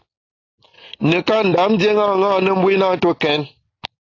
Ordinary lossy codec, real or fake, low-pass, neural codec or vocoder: AAC, 32 kbps; real; 7.2 kHz; none